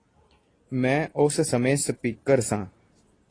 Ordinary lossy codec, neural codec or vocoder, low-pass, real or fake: AAC, 32 kbps; none; 9.9 kHz; real